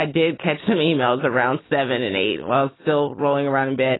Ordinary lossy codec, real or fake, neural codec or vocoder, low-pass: AAC, 16 kbps; real; none; 7.2 kHz